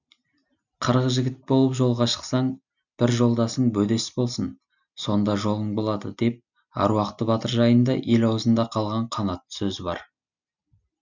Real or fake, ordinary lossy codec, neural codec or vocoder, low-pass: real; none; none; 7.2 kHz